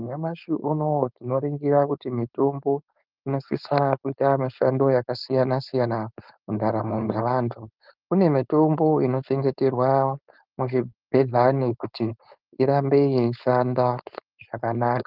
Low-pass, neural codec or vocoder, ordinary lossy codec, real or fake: 5.4 kHz; codec, 16 kHz, 4.8 kbps, FACodec; Opus, 32 kbps; fake